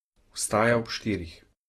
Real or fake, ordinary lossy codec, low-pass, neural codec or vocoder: real; AAC, 32 kbps; 19.8 kHz; none